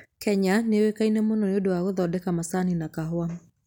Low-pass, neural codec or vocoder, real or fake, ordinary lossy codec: 19.8 kHz; none; real; none